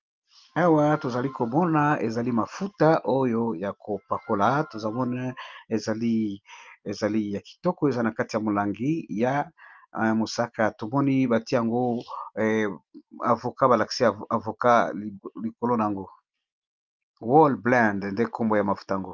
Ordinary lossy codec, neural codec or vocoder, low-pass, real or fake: Opus, 24 kbps; none; 7.2 kHz; real